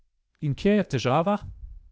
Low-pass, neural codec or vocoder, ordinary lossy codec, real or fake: none; codec, 16 kHz, 0.8 kbps, ZipCodec; none; fake